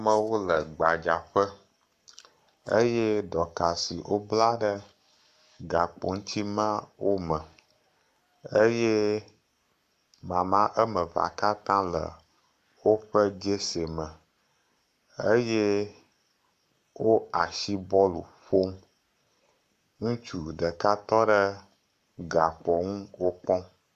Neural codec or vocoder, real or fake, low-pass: codec, 44.1 kHz, 7.8 kbps, Pupu-Codec; fake; 14.4 kHz